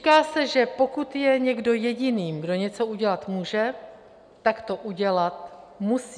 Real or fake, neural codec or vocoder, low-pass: real; none; 9.9 kHz